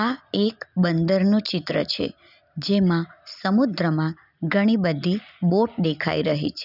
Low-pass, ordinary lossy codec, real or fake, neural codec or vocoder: 5.4 kHz; none; real; none